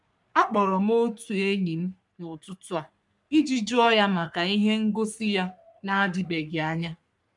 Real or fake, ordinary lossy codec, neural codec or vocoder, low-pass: fake; none; codec, 44.1 kHz, 3.4 kbps, Pupu-Codec; 10.8 kHz